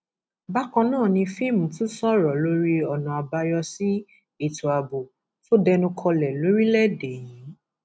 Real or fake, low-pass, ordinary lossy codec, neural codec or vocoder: real; none; none; none